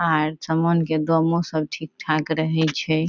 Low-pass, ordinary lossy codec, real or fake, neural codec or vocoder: none; none; real; none